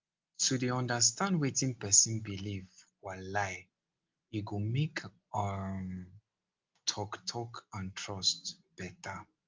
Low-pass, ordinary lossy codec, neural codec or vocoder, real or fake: 7.2 kHz; Opus, 32 kbps; none; real